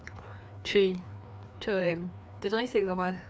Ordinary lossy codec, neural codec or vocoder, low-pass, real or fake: none; codec, 16 kHz, 2 kbps, FreqCodec, larger model; none; fake